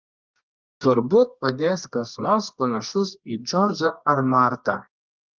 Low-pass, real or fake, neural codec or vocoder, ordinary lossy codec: 7.2 kHz; fake; codec, 24 kHz, 0.9 kbps, WavTokenizer, medium music audio release; Opus, 32 kbps